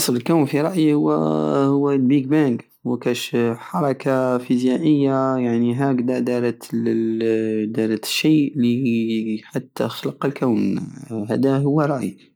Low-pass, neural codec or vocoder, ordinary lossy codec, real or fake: none; none; none; real